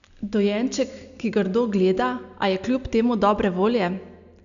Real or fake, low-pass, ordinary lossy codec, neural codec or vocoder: real; 7.2 kHz; none; none